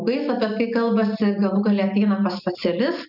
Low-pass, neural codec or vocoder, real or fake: 5.4 kHz; none; real